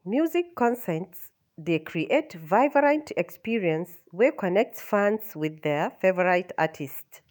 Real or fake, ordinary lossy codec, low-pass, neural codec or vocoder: fake; none; none; autoencoder, 48 kHz, 128 numbers a frame, DAC-VAE, trained on Japanese speech